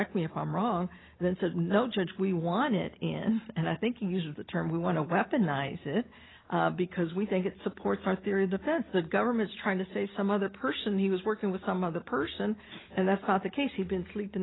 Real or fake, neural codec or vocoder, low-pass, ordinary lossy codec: real; none; 7.2 kHz; AAC, 16 kbps